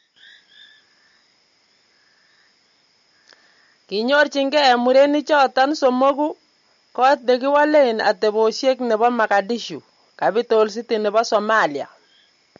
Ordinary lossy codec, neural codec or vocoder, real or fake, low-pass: MP3, 48 kbps; none; real; 7.2 kHz